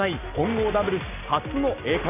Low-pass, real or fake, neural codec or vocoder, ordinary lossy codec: 3.6 kHz; real; none; none